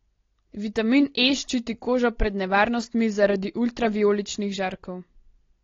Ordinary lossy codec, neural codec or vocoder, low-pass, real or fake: AAC, 32 kbps; none; 7.2 kHz; real